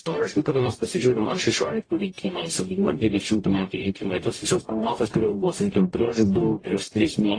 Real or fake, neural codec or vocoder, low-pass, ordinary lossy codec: fake; codec, 44.1 kHz, 0.9 kbps, DAC; 9.9 kHz; AAC, 32 kbps